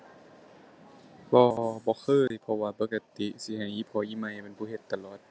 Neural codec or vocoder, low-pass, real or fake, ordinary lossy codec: none; none; real; none